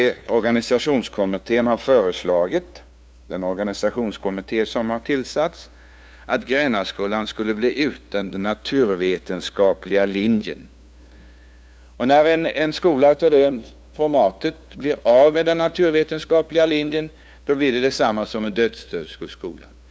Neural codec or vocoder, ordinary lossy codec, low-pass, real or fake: codec, 16 kHz, 2 kbps, FunCodec, trained on LibriTTS, 25 frames a second; none; none; fake